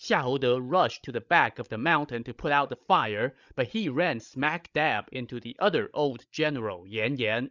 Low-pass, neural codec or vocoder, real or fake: 7.2 kHz; codec, 16 kHz, 16 kbps, FreqCodec, larger model; fake